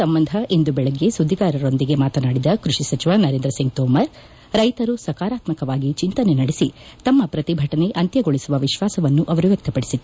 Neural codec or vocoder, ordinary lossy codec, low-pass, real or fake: none; none; none; real